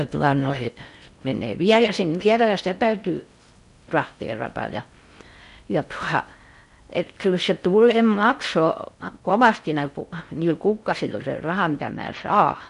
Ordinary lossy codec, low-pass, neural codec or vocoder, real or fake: none; 10.8 kHz; codec, 16 kHz in and 24 kHz out, 0.6 kbps, FocalCodec, streaming, 4096 codes; fake